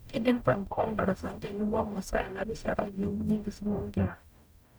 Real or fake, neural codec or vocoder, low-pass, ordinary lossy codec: fake; codec, 44.1 kHz, 0.9 kbps, DAC; none; none